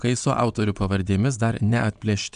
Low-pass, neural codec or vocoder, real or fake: 9.9 kHz; vocoder, 22.05 kHz, 80 mel bands, Vocos; fake